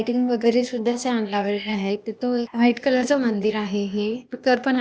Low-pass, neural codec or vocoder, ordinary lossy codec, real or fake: none; codec, 16 kHz, 0.8 kbps, ZipCodec; none; fake